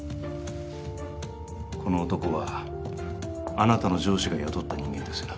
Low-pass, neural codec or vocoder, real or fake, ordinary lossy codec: none; none; real; none